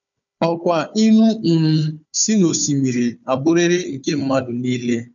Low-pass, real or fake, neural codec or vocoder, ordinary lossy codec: 7.2 kHz; fake; codec, 16 kHz, 4 kbps, FunCodec, trained on Chinese and English, 50 frames a second; MP3, 64 kbps